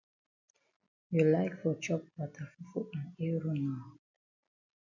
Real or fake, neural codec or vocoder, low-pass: real; none; 7.2 kHz